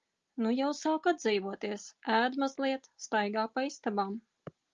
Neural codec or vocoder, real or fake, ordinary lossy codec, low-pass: none; real; Opus, 32 kbps; 7.2 kHz